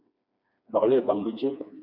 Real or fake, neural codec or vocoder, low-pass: fake; codec, 16 kHz, 2 kbps, FreqCodec, smaller model; 5.4 kHz